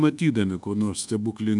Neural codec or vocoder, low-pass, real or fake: codec, 24 kHz, 1.2 kbps, DualCodec; 10.8 kHz; fake